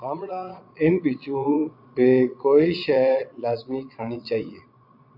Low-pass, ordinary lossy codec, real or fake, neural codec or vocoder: 5.4 kHz; AAC, 48 kbps; fake; vocoder, 22.05 kHz, 80 mel bands, Vocos